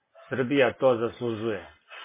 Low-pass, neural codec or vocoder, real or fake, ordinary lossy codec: 3.6 kHz; none; real; MP3, 16 kbps